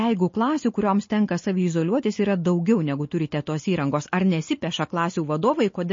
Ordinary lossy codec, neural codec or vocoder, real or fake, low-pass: MP3, 32 kbps; none; real; 7.2 kHz